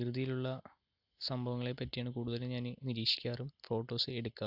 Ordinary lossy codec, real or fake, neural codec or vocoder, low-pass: AAC, 48 kbps; real; none; 5.4 kHz